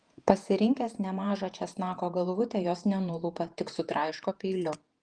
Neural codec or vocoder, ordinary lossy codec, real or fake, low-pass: vocoder, 48 kHz, 128 mel bands, Vocos; Opus, 24 kbps; fake; 9.9 kHz